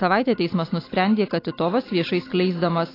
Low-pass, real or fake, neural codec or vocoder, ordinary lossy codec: 5.4 kHz; real; none; AAC, 24 kbps